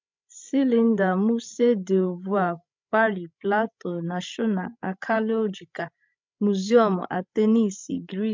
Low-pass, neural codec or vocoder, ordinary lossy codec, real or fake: 7.2 kHz; codec, 16 kHz, 16 kbps, FreqCodec, larger model; MP3, 64 kbps; fake